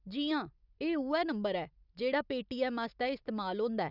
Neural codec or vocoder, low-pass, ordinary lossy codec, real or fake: none; 5.4 kHz; none; real